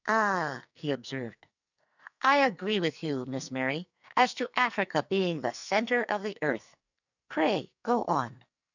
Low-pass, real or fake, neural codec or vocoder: 7.2 kHz; fake; codec, 44.1 kHz, 2.6 kbps, SNAC